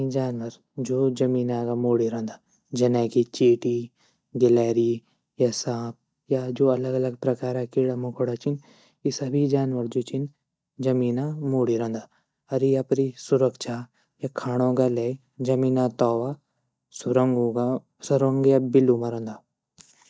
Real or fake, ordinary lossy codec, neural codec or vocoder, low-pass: real; none; none; none